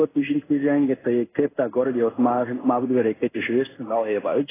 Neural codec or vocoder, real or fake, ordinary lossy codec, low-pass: codec, 16 kHz in and 24 kHz out, 1 kbps, XY-Tokenizer; fake; AAC, 16 kbps; 3.6 kHz